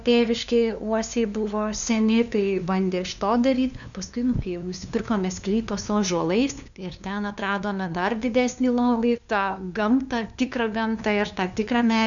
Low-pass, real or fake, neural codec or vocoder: 7.2 kHz; fake; codec, 16 kHz, 2 kbps, FunCodec, trained on LibriTTS, 25 frames a second